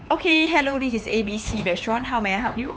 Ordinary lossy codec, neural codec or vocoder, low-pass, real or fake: none; codec, 16 kHz, 4 kbps, X-Codec, HuBERT features, trained on LibriSpeech; none; fake